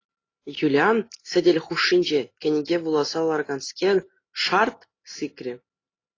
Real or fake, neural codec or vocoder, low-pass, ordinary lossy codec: real; none; 7.2 kHz; AAC, 32 kbps